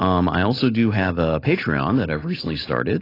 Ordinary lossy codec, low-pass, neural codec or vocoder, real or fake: AAC, 24 kbps; 5.4 kHz; none; real